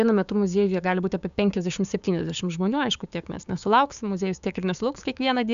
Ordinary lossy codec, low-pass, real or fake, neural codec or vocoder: AAC, 96 kbps; 7.2 kHz; fake; codec, 16 kHz, 6 kbps, DAC